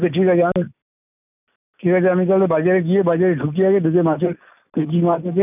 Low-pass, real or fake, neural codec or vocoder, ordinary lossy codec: 3.6 kHz; real; none; none